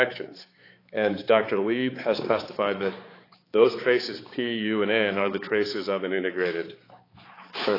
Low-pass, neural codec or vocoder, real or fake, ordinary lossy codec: 5.4 kHz; codec, 16 kHz, 4 kbps, X-Codec, HuBERT features, trained on balanced general audio; fake; AAC, 24 kbps